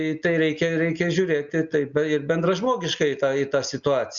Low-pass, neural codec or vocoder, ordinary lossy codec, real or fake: 7.2 kHz; none; Opus, 64 kbps; real